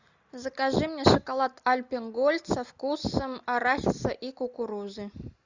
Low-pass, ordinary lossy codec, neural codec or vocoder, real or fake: 7.2 kHz; Opus, 64 kbps; none; real